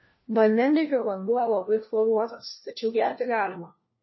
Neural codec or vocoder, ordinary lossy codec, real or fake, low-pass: codec, 16 kHz, 1 kbps, FunCodec, trained on LibriTTS, 50 frames a second; MP3, 24 kbps; fake; 7.2 kHz